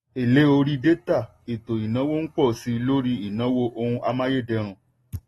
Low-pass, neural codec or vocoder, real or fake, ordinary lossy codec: 19.8 kHz; none; real; AAC, 32 kbps